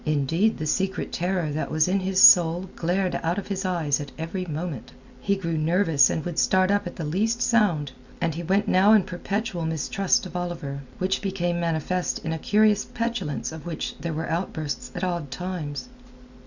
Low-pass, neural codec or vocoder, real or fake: 7.2 kHz; none; real